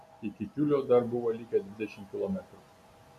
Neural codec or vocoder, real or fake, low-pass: none; real; 14.4 kHz